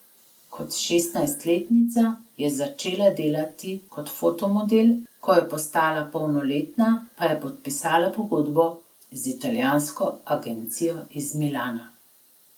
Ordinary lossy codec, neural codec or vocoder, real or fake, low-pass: Opus, 32 kbps; none; real; 19.8 kHz